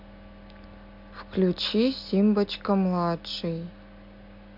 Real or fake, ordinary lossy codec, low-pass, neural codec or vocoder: real; MP3, 48 kbps; 5.4 kHz; none